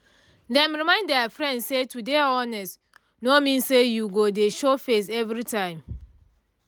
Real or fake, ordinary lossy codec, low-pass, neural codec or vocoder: real; none; none; none